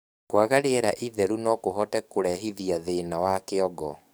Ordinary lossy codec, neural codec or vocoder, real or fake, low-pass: none; codec, 44.1 kHz, 7.8 kbps, DAC; fake; none